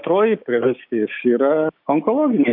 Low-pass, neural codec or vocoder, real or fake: 5.4 kHz; codec, 16 kHz, 6 kbps, DAC; fake